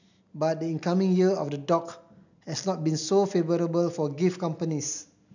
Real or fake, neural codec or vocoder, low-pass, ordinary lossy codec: real; none; 7.2 kHz; none